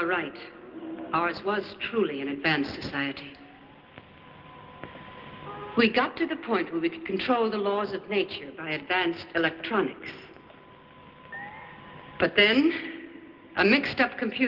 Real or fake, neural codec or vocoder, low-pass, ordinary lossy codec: real; none; 5.4 kHz; Opus, 16 kbps